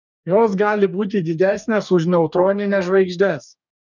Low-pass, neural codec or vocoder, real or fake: 7.2 kHz; codec, 44.1 kHz, 2.6 kbps, DAC; fake